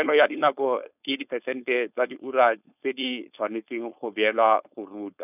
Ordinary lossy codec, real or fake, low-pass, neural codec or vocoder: none; fake; 3.6 kHz; codec, 16 kHz, 4.8 kbps, FACodec